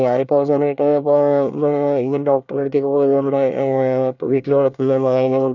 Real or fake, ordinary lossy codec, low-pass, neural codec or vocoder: fake; none; 7.2 kHz; codec, 24 kHz, 1 kbps, SNAC